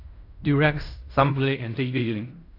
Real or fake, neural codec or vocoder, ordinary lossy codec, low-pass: fake; codec, 16 kHz in and 24 kHz out, 0.4 kbps, LongCat-Audio-Codec, fine tuned four codebook decoder; AAC, 32 kbps; 5.4 kHz